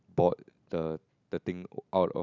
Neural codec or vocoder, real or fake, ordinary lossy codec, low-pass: none; real; none; 7.2 kHz